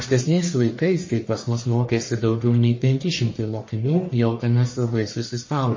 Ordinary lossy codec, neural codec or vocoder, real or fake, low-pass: MP3, 32 kbps; codec, 44.1 kHz, 1.7 kbps, Pupu-Codec; fake; 7.2 kHz